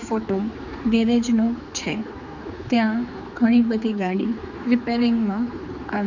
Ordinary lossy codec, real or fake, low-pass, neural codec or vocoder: none; fake; 7.2 kHz; codec, 16 kHz, 4 kbps, X-Codec, HuBERT features, trained on general audio